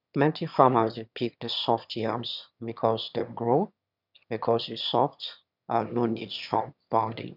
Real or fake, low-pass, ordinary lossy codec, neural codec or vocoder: fake; 5.4 kHz; none; autoencoder, 22.05 kHz, a latent of 192 numbers a frame, VITS, trained on one speaker